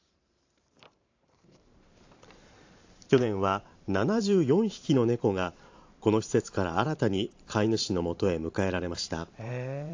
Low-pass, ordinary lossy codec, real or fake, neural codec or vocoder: 7.2 kHz; AAC, 48 kbps; fake; vocoder, 44.1 kHz, 128 mel bands every 512 samples, BigVGAN v2